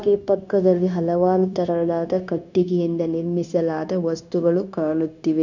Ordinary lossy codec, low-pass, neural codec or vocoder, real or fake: none; 7.2 kHz; codec, 16 kHz, 0.9 kbps, LongCat-Audio-Codec; fake